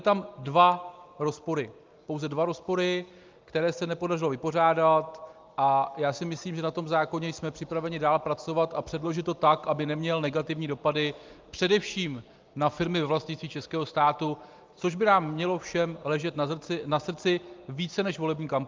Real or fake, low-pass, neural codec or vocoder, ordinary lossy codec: real; 7.2 kHz; none; Opus, 24 kbps